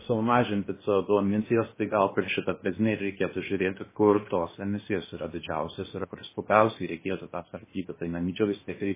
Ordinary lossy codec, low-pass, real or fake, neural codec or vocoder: MP3, 16 kbps; 3.6 kHz; fake; codec, 16 kHz in and 24 kHz out, 0.6 kbps, FocalCodec, streaming, 2048 codes